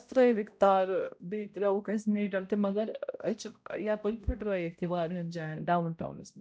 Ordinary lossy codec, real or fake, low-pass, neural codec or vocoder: none; fake; none; codec, 16 kHz, 0.5 kbps, X-Codec, HuBERT features, trained on balanced general audio